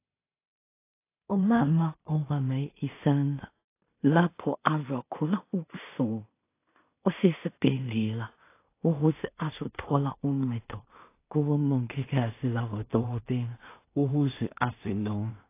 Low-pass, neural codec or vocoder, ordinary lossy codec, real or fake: 3.6 kHz; codec, 16 kHz in and 24 kHz out, 0.4 kbps, LongCat-Audio-Codec, two codebook decoder; AAC, 24 kbps; fake